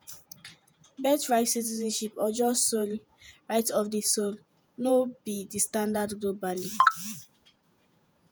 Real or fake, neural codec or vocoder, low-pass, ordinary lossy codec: fake; vocoder, 48 kHz, 128 mel bands, Vocos; none; none